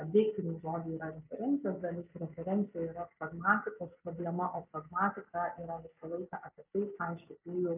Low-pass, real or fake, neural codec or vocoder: 3.6 kHz; real; none